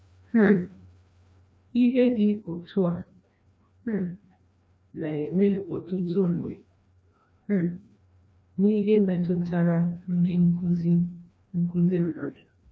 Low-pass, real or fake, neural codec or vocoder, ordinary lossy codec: none; fake; codec, 16 kHz, 1 kbps, FreqCodec, larger model; none